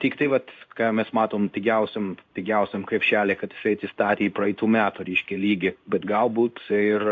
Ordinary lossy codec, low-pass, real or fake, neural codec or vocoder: AAC, 48 kbps; 7.2 kHz; fake; codec, 16 kHz in and 24 kHz out, 1 kbps, XY-Tokenizer